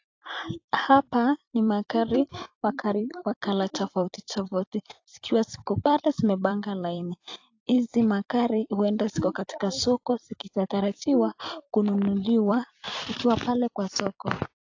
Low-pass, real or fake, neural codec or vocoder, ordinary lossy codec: 7.2 kHz; fake; vocoder, 44.1 kHz, 128 mel bands every 256 samples, BigVGAN v2; AAC, 48 kbps